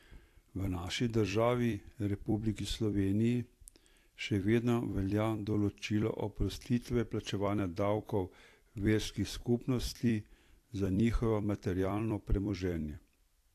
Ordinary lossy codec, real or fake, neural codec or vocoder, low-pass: AAC, 64 kbps; fake; vocoder, 44.1 kHz, 128 mel bands every 256 samples, BigVGAN v2; 14.4 kHz